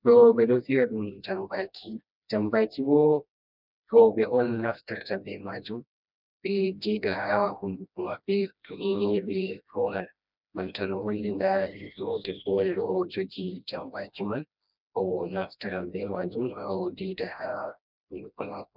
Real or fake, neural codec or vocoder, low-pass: fake; codec, 16 kHz, 1 kbps, FreqCodec, smaller model; 5.4 kHz